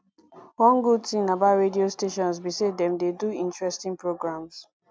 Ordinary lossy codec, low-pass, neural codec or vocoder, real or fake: none; none; none; real